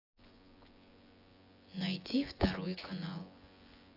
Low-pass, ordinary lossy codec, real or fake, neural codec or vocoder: 5.4 kHz; AAC, 32 kbps; fake; vocoder, 24 kHz, 100 mel bands, Vocos